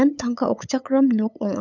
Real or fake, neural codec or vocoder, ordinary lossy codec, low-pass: fake; codec, 16 kHz, 8 kbps, FunCodec, trained on LibriTTS, 25 frames a second; none; 7.2 kHz